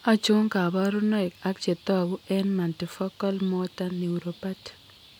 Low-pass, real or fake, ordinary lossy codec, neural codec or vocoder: 19.8 kHz; real; none; none